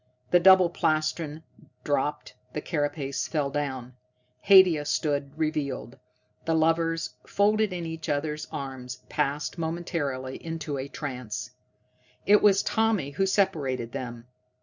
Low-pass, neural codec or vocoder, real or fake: 7.2 kHz; none; real